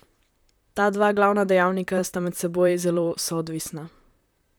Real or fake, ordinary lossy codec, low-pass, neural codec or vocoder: fake; none; none; vocoder, 44.1 kHz, 128 mel bands, Pupu-Vocoder